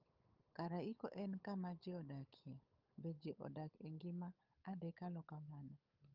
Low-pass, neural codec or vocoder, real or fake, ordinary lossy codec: 5.4 kHz; codec, 16 kHz, 8 kbps, FunCodec, trained on Chinese and English, 25 frames a second; fake; Opus, 32 kbps